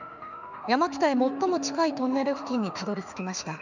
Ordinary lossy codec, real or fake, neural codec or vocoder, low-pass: none; fake; autoencoder, 48 kHz, 32 numbers a frame, DAC-VAE, trained on Japanese speech; 7.2 kHz